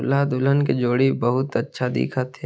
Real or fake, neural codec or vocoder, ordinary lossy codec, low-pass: real; none; none; none